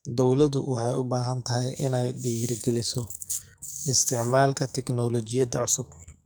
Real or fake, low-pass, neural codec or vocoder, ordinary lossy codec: fake; none; codec, 44.1 kHz, 2.6 kbps, SNAC; none